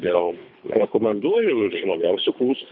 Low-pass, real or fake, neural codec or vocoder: 5.4 kHz; fake; codec, 24 kHz, 3 kbps, HILCodec